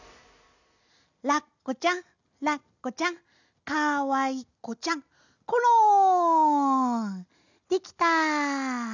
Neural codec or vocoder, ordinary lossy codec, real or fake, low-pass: none; none; real; 7.2 kHz